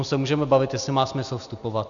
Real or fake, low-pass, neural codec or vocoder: real; 7.2 kHz; none